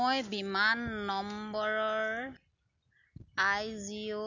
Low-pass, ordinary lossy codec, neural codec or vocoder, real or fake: 7.2 kHz; none; none; real